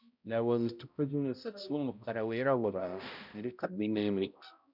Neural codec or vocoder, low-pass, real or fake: codec, 16 kHz, 0.5 kbps, X-Codec, HuBERT features, trained on balanced general audio; 5.4 kHz; fake